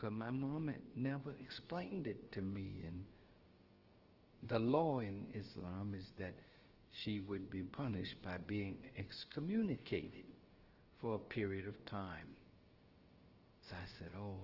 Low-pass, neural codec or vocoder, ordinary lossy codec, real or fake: 5.4 kHz; codec, 16 kHz, about 1 kbps, DyCAST, with the encoder's durations; Opus, 16 kbps; fake